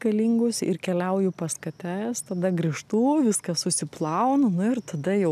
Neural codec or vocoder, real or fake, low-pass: none; real; 14.4 kHz